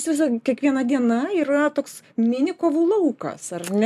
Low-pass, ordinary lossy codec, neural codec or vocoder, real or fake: 14.4 kHz; MP3, 96 kbps; none; real